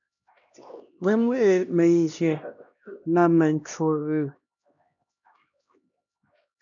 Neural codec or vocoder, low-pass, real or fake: codec, 16 kHz, 1 kbps, X-Codec, HuBERT features, trained on LibriSpeech; 7.2 kHz; fake